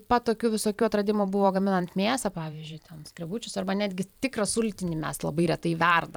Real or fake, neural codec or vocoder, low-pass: fake; vocoder, 44.1 kHz, 128 mel bands every 512 samples, BigVGAN v2; 19.8 kHz